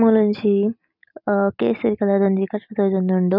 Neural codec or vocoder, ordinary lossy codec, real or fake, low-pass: none; none; real; 5.4 kHz